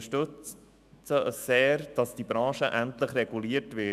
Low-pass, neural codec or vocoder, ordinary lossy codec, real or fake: 14.4 kHz; autoencoder, 48 kHz, 128 numbers a frame, DAC-VAE, trained on Japanese speech; MP3, 96 kbps; fake